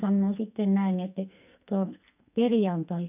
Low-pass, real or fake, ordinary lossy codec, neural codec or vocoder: 3.6 kHz; fake; none; codec, 44.1 kHz, 2.6 kbps, SNAC